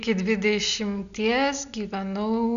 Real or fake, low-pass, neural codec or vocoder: real; 7.2 kHz; none